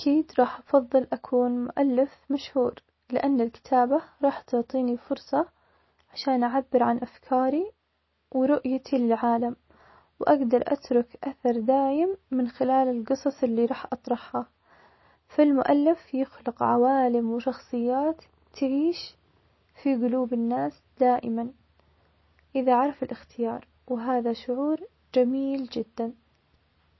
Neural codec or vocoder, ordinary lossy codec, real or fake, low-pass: none; MP3, 24 kbps; real; 7.2 kHz